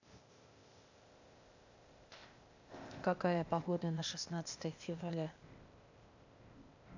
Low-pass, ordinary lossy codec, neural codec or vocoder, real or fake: 7.2 kHz; none; codec, 16 kHz, 0.8 kbps, ZipCodec; fake